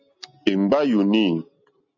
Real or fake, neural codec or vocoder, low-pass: real; none; 7.2 kHz